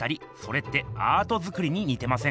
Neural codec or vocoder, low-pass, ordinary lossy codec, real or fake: none; none; none; real